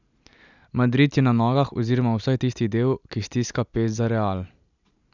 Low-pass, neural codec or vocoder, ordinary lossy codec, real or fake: 7.2 kHz; none; none; real